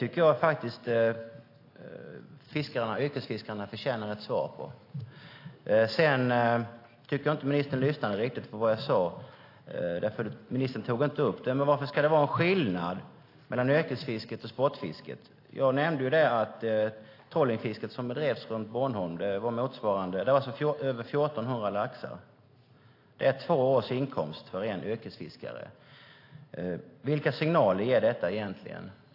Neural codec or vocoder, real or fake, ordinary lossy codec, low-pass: none; real; AAC, 32 kbps; 5.4 kHz